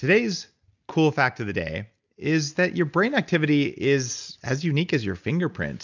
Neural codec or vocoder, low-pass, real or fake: none; 7.2 kHz; real